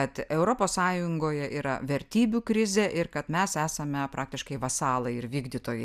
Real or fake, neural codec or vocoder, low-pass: real; none; 14.4 kHz